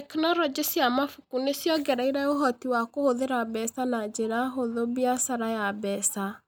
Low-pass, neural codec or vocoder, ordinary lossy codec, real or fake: none; none; none; real